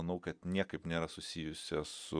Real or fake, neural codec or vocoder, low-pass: real; none; 9.9 kHz